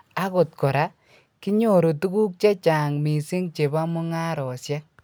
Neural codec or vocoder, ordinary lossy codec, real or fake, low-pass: none; none; real; none